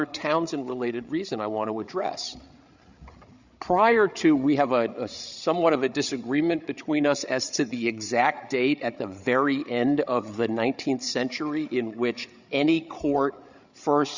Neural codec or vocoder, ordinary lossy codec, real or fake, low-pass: codec, 16 kHz, 8 kbps, FreqCodec, larger model; Opus, 64 kbps; fake; 7.2 kHz